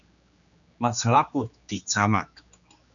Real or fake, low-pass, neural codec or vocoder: fake; 7.2 kHz; codec, 16 kHz, 2 kbps, X-Codec, HuBERT features, trained on general audio